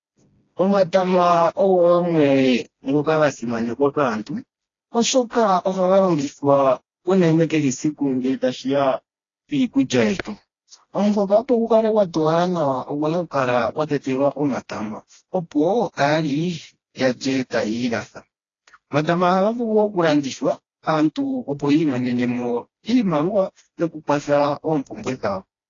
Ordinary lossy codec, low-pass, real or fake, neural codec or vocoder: AAC, 32 kbps; 7.2 kHz; fake; codec, 16 kHz, 1 kbps, FreqCodec, smaller model